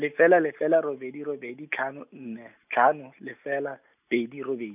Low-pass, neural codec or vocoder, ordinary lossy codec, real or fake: 3.6 kHz; none; none; real